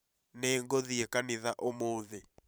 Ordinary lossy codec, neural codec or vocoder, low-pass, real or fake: none; vocoder, 44.1 kHz, 128 mel bands every 512 samples, BigVGAN v2; none; fake